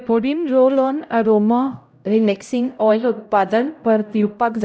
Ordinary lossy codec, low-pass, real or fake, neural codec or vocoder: none; none; fake; codec, 16 kHz, 0.5 kbps, X-Codec, HuBERT features, trained on LibriSpeech